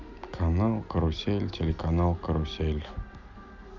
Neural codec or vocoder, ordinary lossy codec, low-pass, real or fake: none; Opus, 64 kbps; 7.2 kHz; real